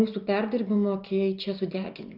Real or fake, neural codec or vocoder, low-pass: real; none; 5.4 kHz